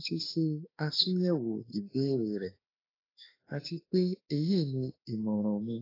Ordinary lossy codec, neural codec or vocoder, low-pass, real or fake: AAC, 24 kbps; autoencoder, 48 kHz, 32 numbers a frame, DAC-VAE, trained on Japanese speech; 5.4 kHz; fake